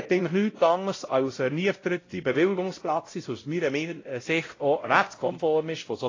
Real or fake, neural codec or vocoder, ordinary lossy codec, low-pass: fake; codec, 16 kHz, 0.5 kbps, X-Codec, WavLM features, trained on Multilingual LibriSpeech; AAC, 32 kbps; 7.2 kHz